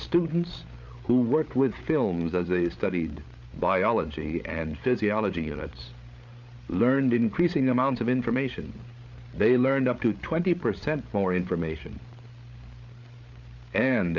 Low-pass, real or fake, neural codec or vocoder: 7.2 kHz; fake; codec, 16 kHz, 8 kbps, FreqCodec, larger model